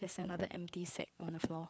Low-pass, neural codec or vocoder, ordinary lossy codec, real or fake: none; codec, 16 kHz, 4 kbps, FreqCodec, larger model; none; fake